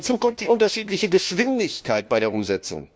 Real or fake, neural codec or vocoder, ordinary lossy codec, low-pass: fake; codec, 16 kHz, 1 kbps, FunCodec, trained on LibriTTS, 50 frames a second; none; none